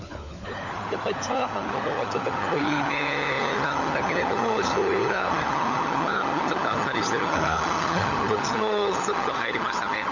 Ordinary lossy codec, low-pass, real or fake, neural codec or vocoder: none; 7.2 kHz; fake; codec, 16 kHz, 16 kbps, FunCodec, trained on LibriTTS, 50 frames a second